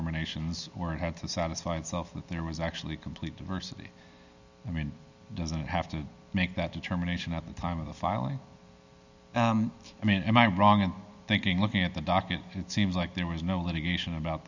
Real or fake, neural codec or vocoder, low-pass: real; none; 7.2 kHz